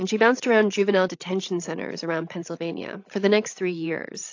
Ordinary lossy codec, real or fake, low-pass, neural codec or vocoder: AAC, 48 kbps; fake; 7.2 kHz; codec, 16 kHz, 16 kbps, FreqCodec, larger model